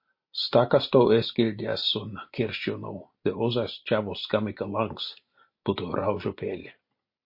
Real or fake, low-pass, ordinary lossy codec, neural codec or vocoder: real; 5.4 kHz; MP3, 32 kbps; none